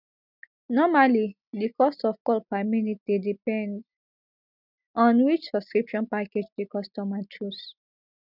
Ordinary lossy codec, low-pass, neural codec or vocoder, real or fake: none; 5.4 kHz; none; real